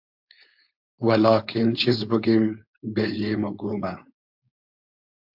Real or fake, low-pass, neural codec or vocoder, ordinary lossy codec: fake; 5.4 kHz; codec, 16 kHz, 4.8 kbps, FACodec; AAC, 48 kbps